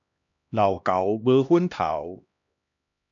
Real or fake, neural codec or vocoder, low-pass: fake; codec, 16 kHz, 1 kbps, X-Codec, HuBERT features, trained on LibriSpeech; 7.2 kHz